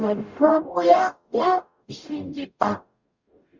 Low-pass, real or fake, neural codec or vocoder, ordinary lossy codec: 7.2 kHz; fake; codec, 44.1 kHz, 0.9 kbps, DAC; Opus, 64 kbps